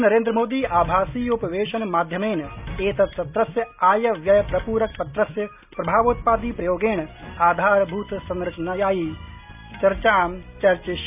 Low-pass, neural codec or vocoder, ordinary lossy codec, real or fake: 3.6 kHz; none; none; real